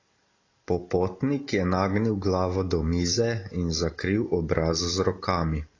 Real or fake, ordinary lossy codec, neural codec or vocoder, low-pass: real; AAC, 32 kbps; none; 7.2 kHz